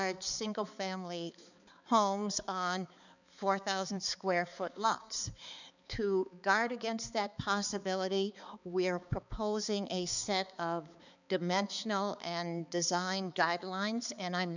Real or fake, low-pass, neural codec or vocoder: fake; 7.2 kHz; codec, 16 kHz, 4 kbps, X-Codec, HuBERT features, trained on balanced general audio